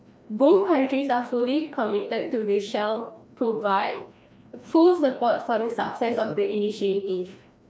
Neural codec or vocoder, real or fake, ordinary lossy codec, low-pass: codec, 16 kHz, 1 kbps, FreqCodec, larger model; fake; none; none